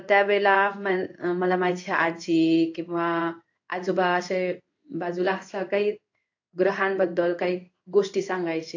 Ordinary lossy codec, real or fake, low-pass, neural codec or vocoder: AAC, 48 kbps; fake; 7.2 kHz; codec, 16 kHz in and 24 kHz out, 1 kbps, XY-Tokenizer